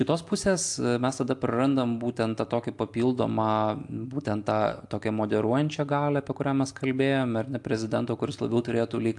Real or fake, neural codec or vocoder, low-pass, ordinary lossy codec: real; none; 10.8 kHz; AAC, 64 kbps